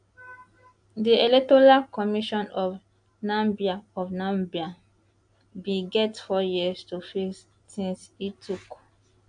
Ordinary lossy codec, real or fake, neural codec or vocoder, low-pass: AAC, 64 kbps; real; none; 9.9 kHz